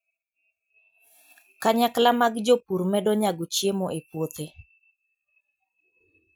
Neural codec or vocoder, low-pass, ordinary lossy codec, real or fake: none; none; none; real